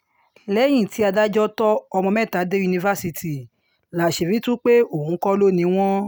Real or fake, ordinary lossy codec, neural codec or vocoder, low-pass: real; none; none; none